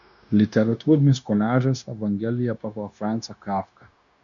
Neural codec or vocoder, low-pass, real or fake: codec, 16 kHz, 0.9 kbps, LongCat-Audio-Codec; 7.2 kHz; fake